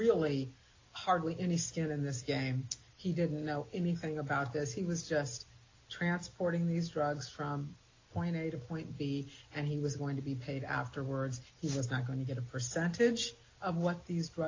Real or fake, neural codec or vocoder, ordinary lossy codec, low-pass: real; none; AAC, 32 kbps; 7.2 kHz